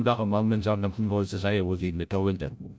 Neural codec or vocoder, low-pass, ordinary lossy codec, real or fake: codec, 16 kHz, 0.5 kbps, FreqCodec, larger model; none; none; fake